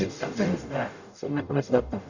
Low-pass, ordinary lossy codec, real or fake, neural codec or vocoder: 7.2 kHz; none; fake; codec, 44.1 kHz, 0.9 kbps, DAC